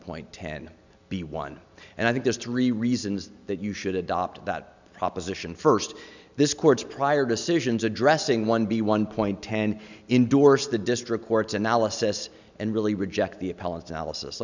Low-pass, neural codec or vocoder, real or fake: 7.2 kHz; none; real